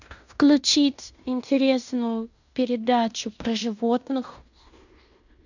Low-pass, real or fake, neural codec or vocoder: 7.2 kHz; fake; codec, 16 kHz in and 24 kHz out, 0.9 kbps, LongCat-Audio-Codec, fine tuned four codebook decoder